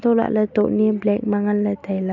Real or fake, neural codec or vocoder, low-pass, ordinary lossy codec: real; none; 7.2 kHz; none